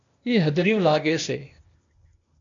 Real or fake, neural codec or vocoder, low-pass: fake; codec, 16 kHz, 0.8 kbps, ZipCodec; 7.2 kHz